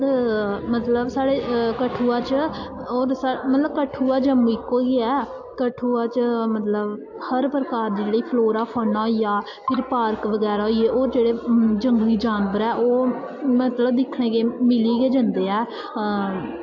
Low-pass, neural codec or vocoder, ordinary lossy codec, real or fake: 7.2 kHz; none; AAC, 48 kbps; real